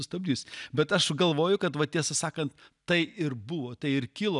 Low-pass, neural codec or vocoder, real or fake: 10.8 kHz; none; real